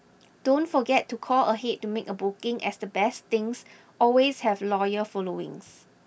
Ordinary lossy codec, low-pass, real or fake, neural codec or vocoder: none; none; real; none